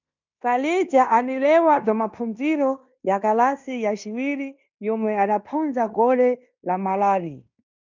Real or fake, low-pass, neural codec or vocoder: fake; 7.2 kHz; codec, 16 kHz in and 24 kHz out, 0.9 kbps, LongCat-Audio-Codec, fine tuned four codebook decoder